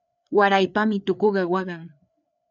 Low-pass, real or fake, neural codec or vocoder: 7.2 kHz; fake; codec, 16 kHz, 4 kbps, FreqCodec, larger model